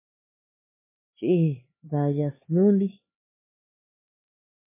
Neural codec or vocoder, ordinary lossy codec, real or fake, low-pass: codec, 16 kHz, 4 kbps, X-Codec, HuBERT features, trained on LibriSpeech; MP3, 16 kbps; fake; 3.6 kHz